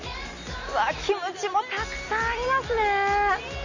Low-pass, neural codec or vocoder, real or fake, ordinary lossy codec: 7.2 kHz; none; real; none